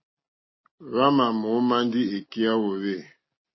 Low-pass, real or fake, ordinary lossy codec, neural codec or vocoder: 7.2 kHz; real; MP3, 24 kbps; none